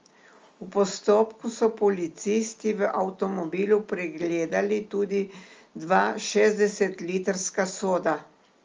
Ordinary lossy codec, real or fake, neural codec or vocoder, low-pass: Opus, 32 kbps; real; none; 7.2 kHz